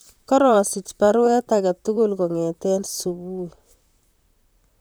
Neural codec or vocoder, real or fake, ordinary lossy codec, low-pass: vocoder, 44.1 kHz, 128 mel bands, Pupu-Vocoder; fake; none; none